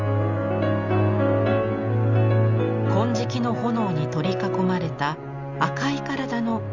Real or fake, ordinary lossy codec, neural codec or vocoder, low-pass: real; Opus, 64 kbps; none; 7.2 kHz